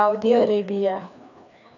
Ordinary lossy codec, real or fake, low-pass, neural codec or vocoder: none; fake; 7.2 kHz; codec, 16 kHz, 2 kbps, FreqCodec, larger model